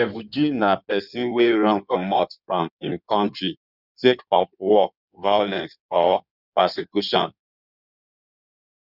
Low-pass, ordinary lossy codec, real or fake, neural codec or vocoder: 5.4 kHz; none; fake; codec, 16 kHz in and 24 kHz out, 1.1 kbps, FireRedTTS-2 codec